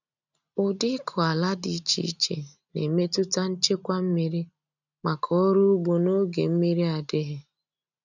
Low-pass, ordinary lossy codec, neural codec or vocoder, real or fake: 7.2 kHz; none; none; real